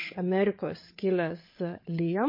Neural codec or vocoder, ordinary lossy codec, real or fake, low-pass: codec, 16 kHz, 8 kbps, FreqCodec, larger model; MP3, 24 kbps; fake; 5.4 kHz